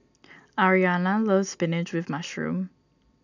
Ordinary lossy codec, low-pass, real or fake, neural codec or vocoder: none; 7.2 kHz; real; none